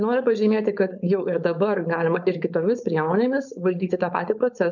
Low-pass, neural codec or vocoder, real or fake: 7.2 kHz; codec, 16 kHz, 4.8 kbps, FACodec; fake